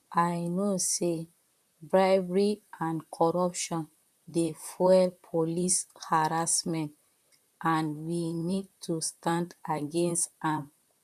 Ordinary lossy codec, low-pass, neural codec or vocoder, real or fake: none; 14.4 kHz; vocoder, 44.1 kHz, 128 mel bands, Pupu-Vocoder; fake